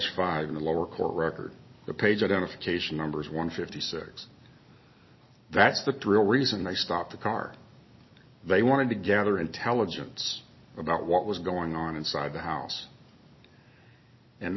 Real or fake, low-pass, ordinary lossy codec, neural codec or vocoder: real; 7.2 kHz; MP3, 24 kbps; none